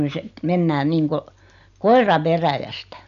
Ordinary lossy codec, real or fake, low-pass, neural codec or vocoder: none; real; 7.2 kHz; none